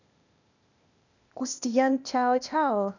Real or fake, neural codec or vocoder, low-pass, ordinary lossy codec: fake; codec, 16 kHz, 0.8 kbps, ZipCodec; 7.2 kHz; none